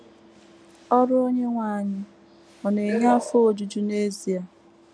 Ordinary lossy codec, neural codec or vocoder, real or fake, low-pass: none; none; real; none